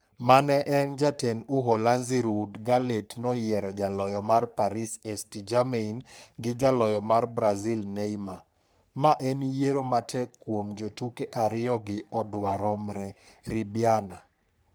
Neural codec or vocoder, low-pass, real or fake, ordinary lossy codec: codec, 44.1 kHz, 3.4 kbps, Pupu-Codec; none; fake; none